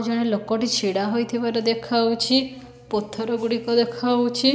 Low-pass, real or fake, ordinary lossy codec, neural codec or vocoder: none; real; none; none